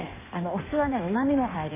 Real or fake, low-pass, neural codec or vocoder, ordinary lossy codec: fake; 3.6 kHz; codec, 16 kHz in and 24 kHz out, 1.1 kbps, FireRedTTS-2 codec; MP3, 16 kbps